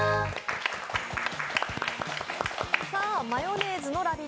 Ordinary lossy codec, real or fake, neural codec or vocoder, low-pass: none; real; none; none